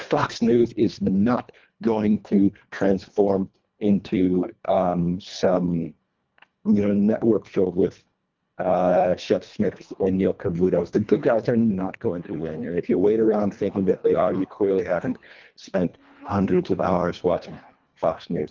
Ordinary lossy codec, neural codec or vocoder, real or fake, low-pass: Opus, 24 kbps; codec, 24 kHz, 1.5 kbps, HILCodec; fake; 7.2 kHz